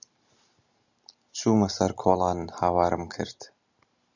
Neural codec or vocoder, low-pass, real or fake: none; 7.2 kHz; real